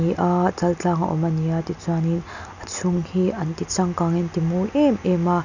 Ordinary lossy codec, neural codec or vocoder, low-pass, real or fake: none; none; 7.2 kHz; real